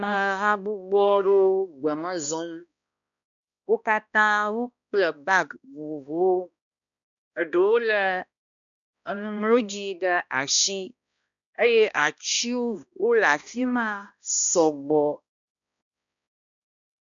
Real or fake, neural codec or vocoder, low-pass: fake; codec, 16 kHz, 1 kbps, X-Codec, HuBERT features, trained on balanced general audio; 7.2 kHz